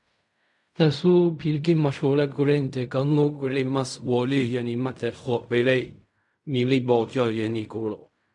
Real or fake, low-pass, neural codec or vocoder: fake; 10.8 kHz; codec, 16 kHz in and 24 kHz out, 0.4 kbps, LongCat-Audio-Codec, fine tuned four codebook decoder